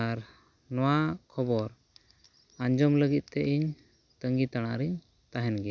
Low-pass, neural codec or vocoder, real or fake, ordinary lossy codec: 7.2 kHz; none; real; none